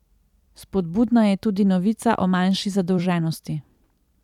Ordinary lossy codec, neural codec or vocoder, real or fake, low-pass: none; vocoder, 44.1 kHz, 128 mel bands every 256 samples, BigVGAN v2; fake; 19.8 kHz